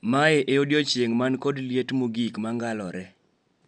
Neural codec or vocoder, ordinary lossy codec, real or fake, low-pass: none; none; real; 9.9 kHz